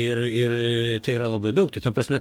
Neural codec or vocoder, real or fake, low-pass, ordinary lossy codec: codec, 44.1 kHz, 2.6 kbps, DAC; fake; 19.8 kHz; MP3, 96 kbps